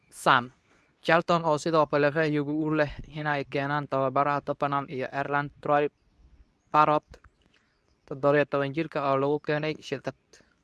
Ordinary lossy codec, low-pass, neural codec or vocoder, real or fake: none; none; codec, 24 kHz, 0.9 kbps, WavTokenizer, medium speech release version 2; fake